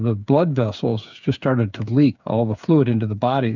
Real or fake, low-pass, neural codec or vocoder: fake; 7.2 kHz; codec, 16 kHz, 8 kbps, FreqCodec, smaller model